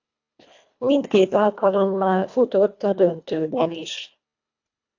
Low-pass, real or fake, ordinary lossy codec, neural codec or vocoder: 7.2 kHz; fake; AAC, 48 kbps; codec, 24 kHz, 1.5 kbps, HILCodec